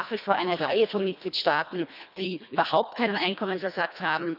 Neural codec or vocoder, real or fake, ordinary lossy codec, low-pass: codec, 24 kHz, 1.5 kbps, HILCodec; fake; none; 5.4 kHz